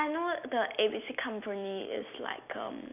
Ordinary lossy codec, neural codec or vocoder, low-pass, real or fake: none; none; 3.6 kHz; real